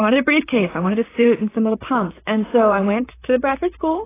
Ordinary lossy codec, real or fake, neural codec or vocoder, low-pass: AAC, 16 kbps; fake; vocoder, 44.1 kHz, 128 mel bands, Pupu-Vocoder; 3.6 kHz